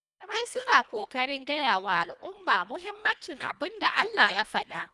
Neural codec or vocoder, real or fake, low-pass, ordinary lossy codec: codec, 24 kHz, 1.5 kbps, HILCodec; fake; none; none